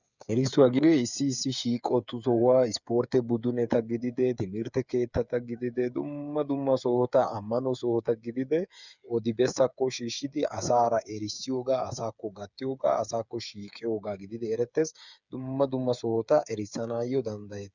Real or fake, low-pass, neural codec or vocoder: fake; 7.2 kHz; codec, 16 kHz, 8 kbps, FreqCodec, smaller model